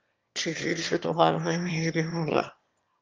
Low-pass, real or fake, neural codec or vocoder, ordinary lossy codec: 7.2 kHz; fake; autoencoder, 22.05 kHz, a latent of 192 numbers a frame, VITS, trained on one speaker; Opus, 24 kbps